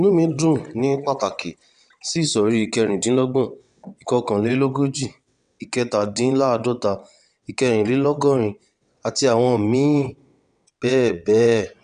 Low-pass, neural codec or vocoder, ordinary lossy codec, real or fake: 9.9 kHz; vocoder, 22.05 kHz, 80 mel bands, WaveNeXt; none; fake